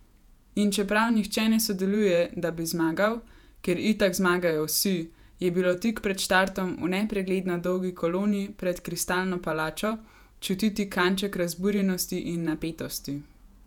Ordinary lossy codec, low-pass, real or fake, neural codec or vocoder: none; 19.8 kHz; fake; vocoder, 48 kHz, 128 mel bands, Vocos